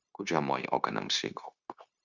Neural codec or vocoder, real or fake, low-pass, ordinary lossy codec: codec, 16 kHz, 0.9 kbps, LongCat-Audio-Codec; fake; 7.2 kHz; Opus, 64 kbps